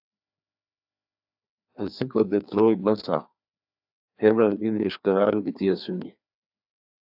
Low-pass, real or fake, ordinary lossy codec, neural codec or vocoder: 5.4 kHz; fake; AAC, 48 kbps; codec, 16 kHz, 2 kbps, FreqCodec, larger model